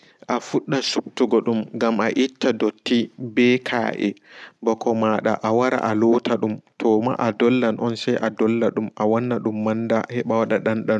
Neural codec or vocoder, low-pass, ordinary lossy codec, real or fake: none; none; none; real